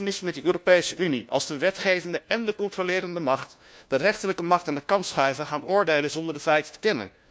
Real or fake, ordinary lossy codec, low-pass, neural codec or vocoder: fake; none; none; codec, 16 kHz, 1 kbps, FunCodec, trained on LibriTTS, 50 frames a second